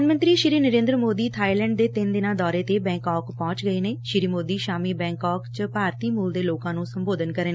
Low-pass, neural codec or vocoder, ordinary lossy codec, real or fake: none; none; none; real